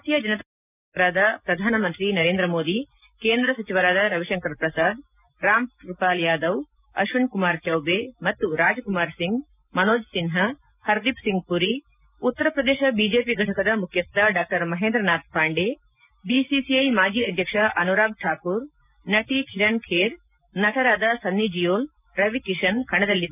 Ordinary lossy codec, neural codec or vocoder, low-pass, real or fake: none; none; 3.6 kHz; real